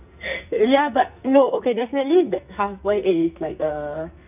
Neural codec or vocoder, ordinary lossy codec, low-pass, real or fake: codec, 44.1 kHz, 2.6 kbps, SNAC; none; 3.6 kHz; fake